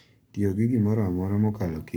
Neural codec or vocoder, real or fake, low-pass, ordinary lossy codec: codec, 44.1 kHz, 7.8 kbps, Pupu-Codec; fake; none; none